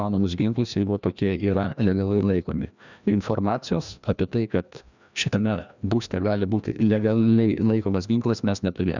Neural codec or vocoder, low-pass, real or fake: codec, 16 kHz, 1 kbps, FreqCodec, larger model; 7.2 kHz; fake